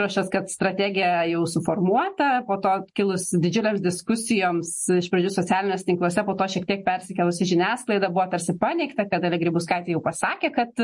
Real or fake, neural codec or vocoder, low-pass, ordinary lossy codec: real; none; 10.8 kHz; MP3, 48 kbps